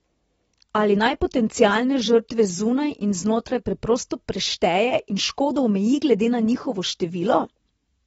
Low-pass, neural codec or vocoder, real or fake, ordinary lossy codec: 19.8 kHz; none; real; AAC, 24 kbps